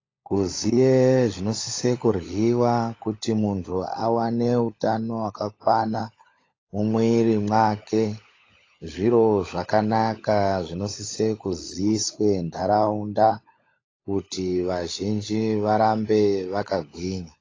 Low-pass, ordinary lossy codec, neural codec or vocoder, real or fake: 7.2 kHz; AAC, 32 kbps; codec, 16 kHz, 16 kbps, FunCodec, trained on LibriTTS, 50 frames a second; fake